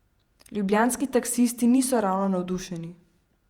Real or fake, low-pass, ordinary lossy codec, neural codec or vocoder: fake; 19.8 kHz; Opus, 64 kbps; vocoder, 48 kHz, 128 mel bands, Vocos